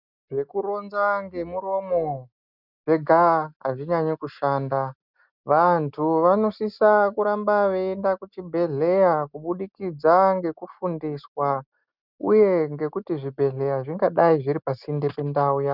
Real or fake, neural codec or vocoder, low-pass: real; none; 5.4 kHz